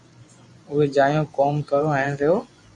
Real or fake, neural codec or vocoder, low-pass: fake; vocoder, 24 kHz, 100 mel bands, Vocos; 10.8 kHz